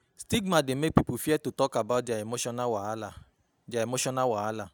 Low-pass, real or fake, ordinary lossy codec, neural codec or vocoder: none; real; none; none